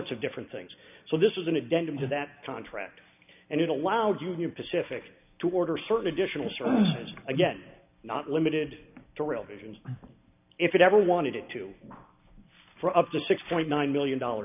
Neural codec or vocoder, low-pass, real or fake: none; 3.6 kHz; real